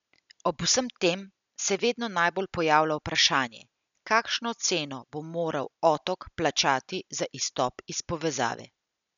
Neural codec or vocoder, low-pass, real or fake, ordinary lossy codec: none; 7.2 kHz; real; none